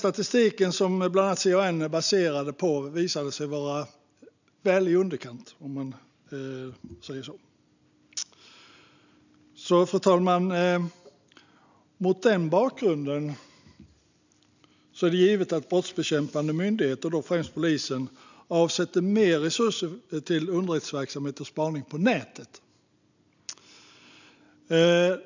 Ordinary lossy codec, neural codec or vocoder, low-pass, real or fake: none; none; 7.2 kHz; real